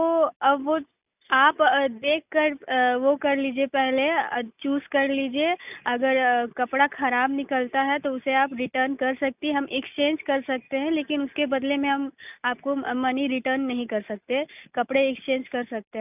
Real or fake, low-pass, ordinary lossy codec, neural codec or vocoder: real; 3.6 kHz; none; none